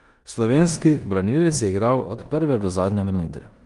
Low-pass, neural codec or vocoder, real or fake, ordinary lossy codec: 10.8 kHz; codec, 16 kHz in and 24 kHz out, 0.9 kbps, LongCat-Audio-Codec, four codebook decoder; fake; Opus, 32 kbps